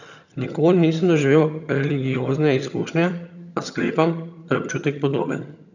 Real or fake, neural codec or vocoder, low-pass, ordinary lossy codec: fake; vocoder, 22.05 kHz, 80 mel bands, HiFi-GAN; 7.2 kHz; none